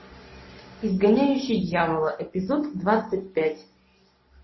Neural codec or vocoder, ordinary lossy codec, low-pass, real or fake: none; MP3, 24 kbps; 7.2 kHz; real